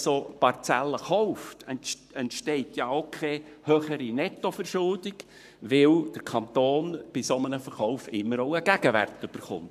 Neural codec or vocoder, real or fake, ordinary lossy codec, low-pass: codec, 44.1 kHz, 7.8 kbps, Pupu-Codec; fake; none; 14.4 kHz